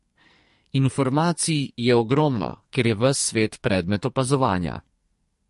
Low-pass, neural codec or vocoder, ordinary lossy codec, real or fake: 14.4 kHz; codec, 44.1 kHz, 2.6 kbps, SNAC; MP3, 48 kbps; fake